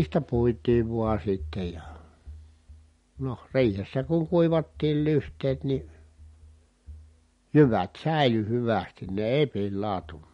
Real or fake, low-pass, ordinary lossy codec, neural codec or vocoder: real; 10.8 kHz; MP3, 48 kbps; none